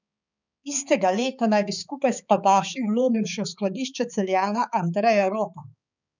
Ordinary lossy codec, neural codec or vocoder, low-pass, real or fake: none; codec, 16 kHz, 4 kbps, X-Codec, HuBERT features, trained on balanced general audio; 7.2 kHz; fake